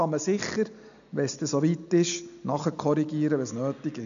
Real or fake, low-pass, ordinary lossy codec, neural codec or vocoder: real; 7.2 kHz; none; none